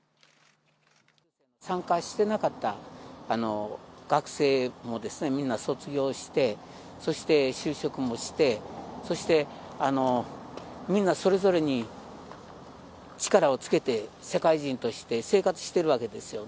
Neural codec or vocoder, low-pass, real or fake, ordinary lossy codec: none; none; real; none